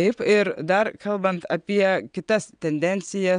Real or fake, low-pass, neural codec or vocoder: fake; 9.9 kHz; vocoder, 22.05 kHz, 80 mel bands, WaveNeXt